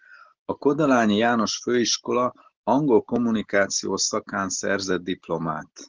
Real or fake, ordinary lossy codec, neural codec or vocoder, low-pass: real; Opus, 16 kbps; none; 7.2 kHz